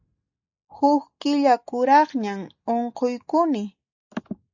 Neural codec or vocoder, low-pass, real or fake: none; 7.2 kHz; real